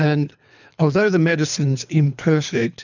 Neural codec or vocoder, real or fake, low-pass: codec, 24 kHz, 3 kbps, HILCodec; fake; 7.2 kHz